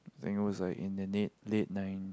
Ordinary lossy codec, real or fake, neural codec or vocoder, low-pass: none; real; none; none